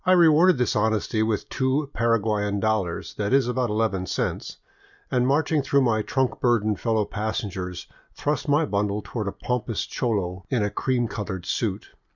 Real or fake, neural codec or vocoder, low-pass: real; none; 7.2 kHz